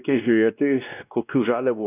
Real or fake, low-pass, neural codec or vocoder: fake; 3.6 kHz; codec, 16 kHz, 1 kbps, X-Codec, WavLM features, trained on Multilingual LibriSpeech